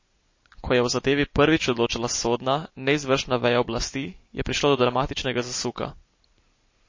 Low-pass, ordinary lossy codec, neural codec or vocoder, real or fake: 7.2 kHz; MP3, 32 kbps; none; real